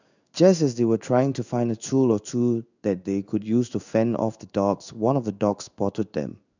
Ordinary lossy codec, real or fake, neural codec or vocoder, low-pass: none; fake; codec, 16 kHz in and 24 kHz out, 1 kbps, XY-Tokenizer; 7.2 kHz